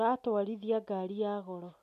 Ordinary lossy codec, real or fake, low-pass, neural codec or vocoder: none; real; 14.4 kHz; none